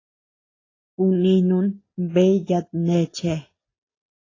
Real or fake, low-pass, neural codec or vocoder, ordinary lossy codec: real; 7.2 kHz; none; AAC, 32 kbps